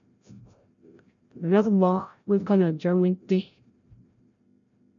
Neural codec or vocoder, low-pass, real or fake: codec, 16 kHz, 0.5 kbps, FreqCodec, larger model; 7.2 kHz; fake